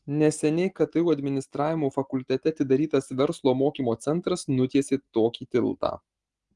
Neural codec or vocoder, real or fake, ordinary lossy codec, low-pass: none; real; Opus, 24 kbps; 10.8 kHz